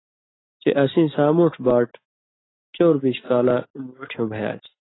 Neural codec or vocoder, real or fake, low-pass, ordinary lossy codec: none; real; 7.2 kHz; AAC, 16 kbps